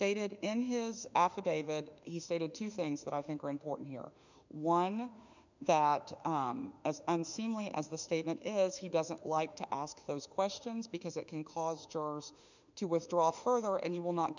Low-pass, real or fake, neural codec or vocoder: 7.2 kHz; fake; autoencoder, 48 kHz, 32 numbers a frame, DAC-VAE, trained on Japanese speech